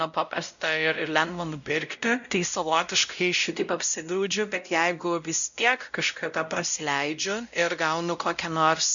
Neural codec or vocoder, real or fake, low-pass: codec, 16 kHz, 0.5 kbps, X-Codec, WavLM features, trained on Multilingual LibriSpeech; fake; 7.2 kHz